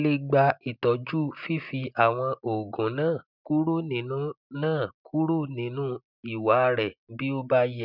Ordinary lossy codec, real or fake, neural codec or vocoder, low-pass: none; real; none; 5.4 kHz